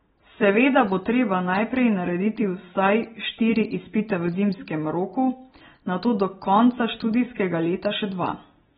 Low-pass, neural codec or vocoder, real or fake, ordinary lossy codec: 10.8 kHz; none; real; AAC, 16 kbps